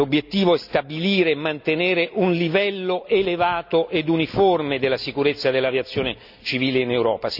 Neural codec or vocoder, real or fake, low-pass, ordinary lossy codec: none; real; 5.4 kHz; none